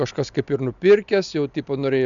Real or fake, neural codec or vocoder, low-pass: real; none; 7.2 kHz